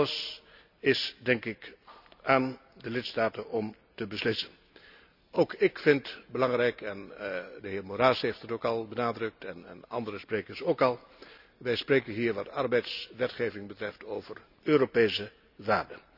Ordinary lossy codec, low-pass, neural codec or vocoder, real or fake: none; 5.4 kHz; none; real